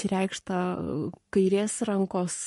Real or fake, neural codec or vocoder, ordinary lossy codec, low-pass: fake; codec, 44.1 kHz, 7.8 kbps, Pupu-Codec; MP3, 48 kbps; 14.4 kHz